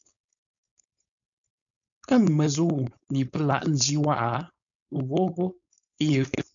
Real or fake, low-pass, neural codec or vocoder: fake; 7.2 kHz; codec, 16 kHz, 4.8 kbps, FACodec